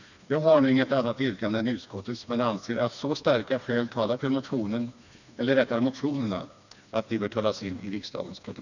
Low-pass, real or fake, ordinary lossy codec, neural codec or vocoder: 7.2 kHz; fake; none; codec, 16 kHz, 2 kbps, FreqCodec, smaller model